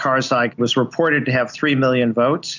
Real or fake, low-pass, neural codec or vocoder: real; 7.2 kHz; none